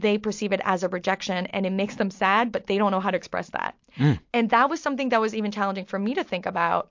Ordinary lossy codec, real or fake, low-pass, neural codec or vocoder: MP3, 48 kbps; real; 7.2 kHz; none